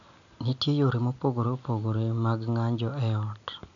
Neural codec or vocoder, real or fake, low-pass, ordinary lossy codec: none; real; 7.2 kHz; none